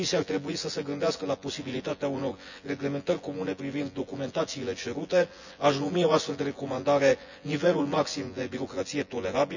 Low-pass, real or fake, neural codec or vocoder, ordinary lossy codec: 7.2 kHz; fake; vocoder, 24 kHz, 100 mel bands, Vocos; none